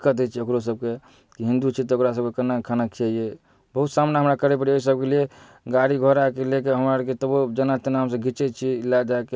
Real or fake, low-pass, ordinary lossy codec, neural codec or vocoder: real; none; none; none